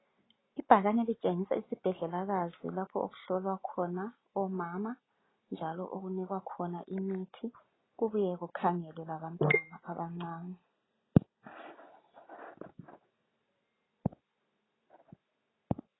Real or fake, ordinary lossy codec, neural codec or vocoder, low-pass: real; AAC, 16 kbps; none; 7.2 kHz